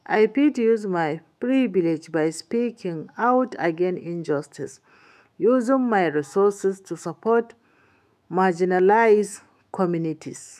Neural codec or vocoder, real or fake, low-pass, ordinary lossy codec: autoencoder, 48 kHz, 128 numbers a frame, DAC-VAE, trained on Japanese speech; fake; 14.4 kHz; none